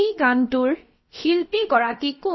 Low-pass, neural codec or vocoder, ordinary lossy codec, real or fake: 7.2 kHz; codec, 16 kHz, about 1 kbps, DyCAST, with the encoder's durations; MP3, 24 kbps; fake